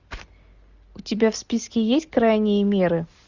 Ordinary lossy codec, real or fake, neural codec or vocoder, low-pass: Opus, 64 kbps; real; none; 7.2 kHz